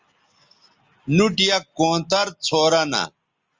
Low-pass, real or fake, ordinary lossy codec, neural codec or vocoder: 7.2 kHz; real; Opus, 32 kbps; none